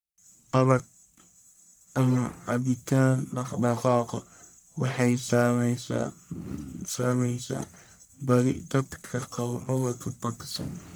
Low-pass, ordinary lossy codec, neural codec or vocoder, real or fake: none; none; codec, 44.1 kHz, 1.7 kbps, Pupu-Codec; fake